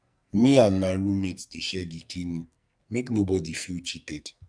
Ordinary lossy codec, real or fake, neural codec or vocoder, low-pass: none; fake; codec, 44.1 kHz, 2.6 kbps, SNAC; 9.9 kHz